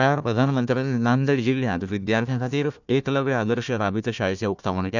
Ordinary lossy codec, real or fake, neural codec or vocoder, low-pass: none; fake; codec, 16 kHz, 1 kbps, FunCodec, trained on Chinese and English, 50 frames a second; 7.2 kHz